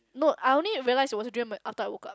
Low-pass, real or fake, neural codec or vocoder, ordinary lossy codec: none; real; none; none